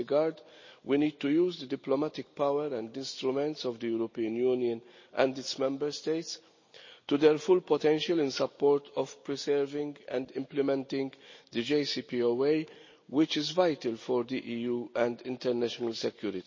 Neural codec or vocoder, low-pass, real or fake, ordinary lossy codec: none; 7.2 kHz; real; MP3, 32 kbps